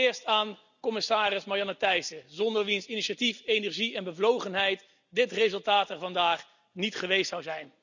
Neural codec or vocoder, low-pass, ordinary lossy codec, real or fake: none; 7.2 kHz; none; real